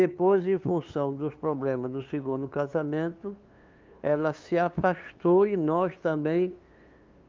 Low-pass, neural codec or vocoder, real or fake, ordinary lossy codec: 7.2 kHz; codec, 16 kHz, 2 kbps, FunCodec, trained on LibriTTS, 25 frames a second; fake; Opus, 32 kbps